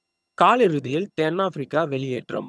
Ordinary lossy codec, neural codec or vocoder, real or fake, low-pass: none; vocoder, 22.05 kHz, 80 mel bands, HiFi-GAN; fake; none